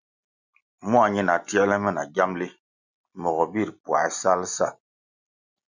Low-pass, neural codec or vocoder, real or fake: 7.2 kHz; none; real